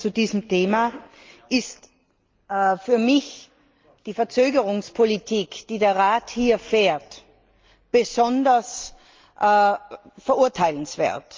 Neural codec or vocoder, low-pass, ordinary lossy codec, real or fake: none; 7.2 kHz; Opus, 24 kbps; real